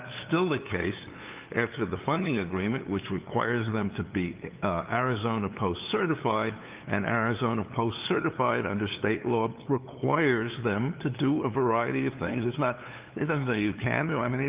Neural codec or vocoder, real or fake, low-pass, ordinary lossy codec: codec, 16 kHz, 8 kbps, FunCodec, trained on LibriTTS, 25 frames a second; fake; 3.6 kHz; Opus, 24 kbps